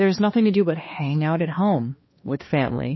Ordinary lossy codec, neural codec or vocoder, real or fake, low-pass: MP3, 24 kbps; codec, 16 kHz, 2 kbps, X-Codec, HuBERT features, trained on balanced general audio; fake; 7.2 kHz